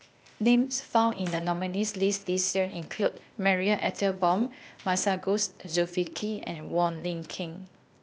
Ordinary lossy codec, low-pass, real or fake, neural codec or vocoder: none; none; fake; codec, 16 kHz, 0.8 kbps, ZipCodec